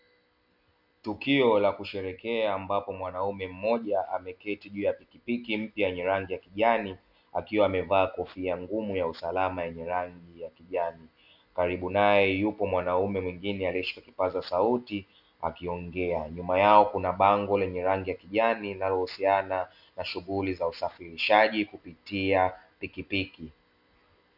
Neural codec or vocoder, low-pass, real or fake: none; 5.4 kHz; real